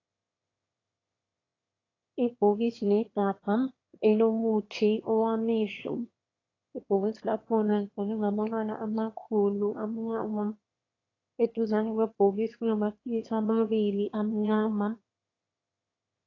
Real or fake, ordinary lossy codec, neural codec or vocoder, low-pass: fake; AAC, 32 kbps; autoencoder, 22.05 kHz, a latent of 192 numbers a frame, VITS, trained on one speaker; 7.2 kHz